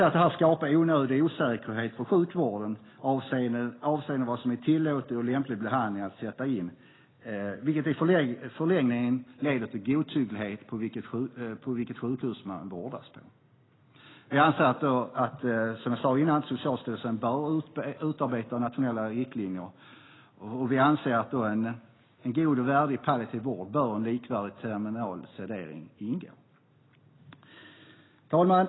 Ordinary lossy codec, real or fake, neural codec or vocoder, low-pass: AAC, 16 kbps; real; none; 7.2 kHz